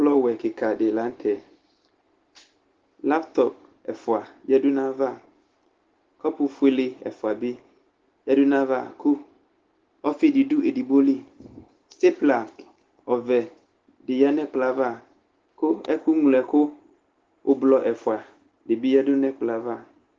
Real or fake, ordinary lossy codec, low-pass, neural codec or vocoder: real; Opus, 16 kbps; 7.2 kHz; none